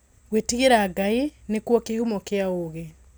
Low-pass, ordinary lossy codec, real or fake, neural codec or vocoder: none; none; real; none